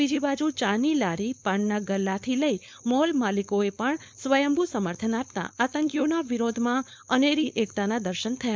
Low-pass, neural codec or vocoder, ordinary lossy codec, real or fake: none; codec, 16 kHz, 4.8 kbps, FACodec; none; fake